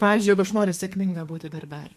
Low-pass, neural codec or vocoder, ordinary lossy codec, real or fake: 14.4 kHz; codec, 32 kHz, 1.9 kbps, SNAC; MP3, 64 kbps; fake